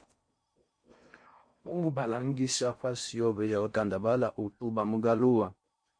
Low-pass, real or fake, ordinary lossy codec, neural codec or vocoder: 9.9 kHz; fake; MP3, 48 kbps; codec, 16 kHz in and 24 kHz out, 0.6 kbps, FocalCodec, streaming, 4096 codes